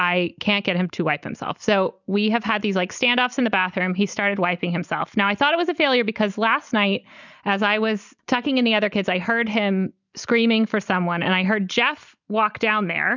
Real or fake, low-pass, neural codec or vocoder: real; 7.2 kHz; none